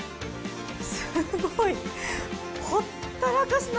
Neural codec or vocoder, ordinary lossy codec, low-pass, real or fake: none; none; none; real